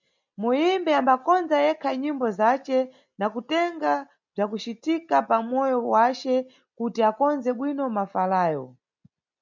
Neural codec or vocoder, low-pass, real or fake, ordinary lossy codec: none; 7.2 kHz; real; MP3, 64 kbps